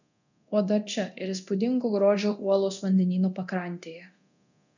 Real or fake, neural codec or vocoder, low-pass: fake; codec, 24 kHz, 0.9 kbps, DualCodec; 7.2 kHz